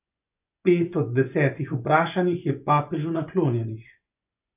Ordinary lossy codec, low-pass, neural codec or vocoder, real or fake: none; 3.6 kHz; vocoder, 24 kHz, 100 mel bands, Vocos; fake